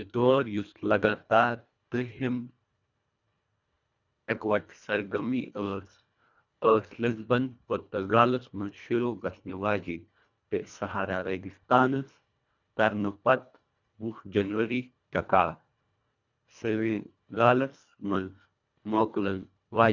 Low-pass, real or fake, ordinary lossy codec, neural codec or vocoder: 7.2 kHz; fake; none; codec, 24 kHz, 1.5 kbps, HILCodec